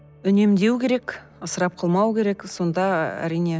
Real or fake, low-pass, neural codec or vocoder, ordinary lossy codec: real; none; none; none